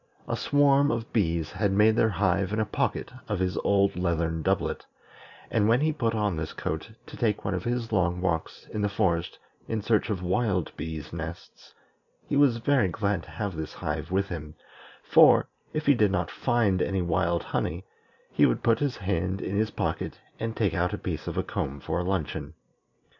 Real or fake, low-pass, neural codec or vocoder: real; 7.2 kHz; none